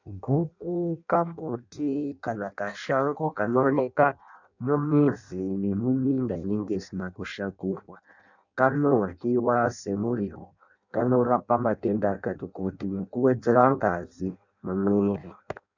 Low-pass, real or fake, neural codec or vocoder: 7.2 kHz; fake; codec, 16 kHz in and 24 kHz out, 0.6 kbps, FireRedTTS-2 codec